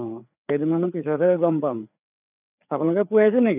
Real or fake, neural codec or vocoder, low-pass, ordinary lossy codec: fake; codec, 16 kHz, 4 kbps, FreqCodec, larger model; 3.6 kHz; none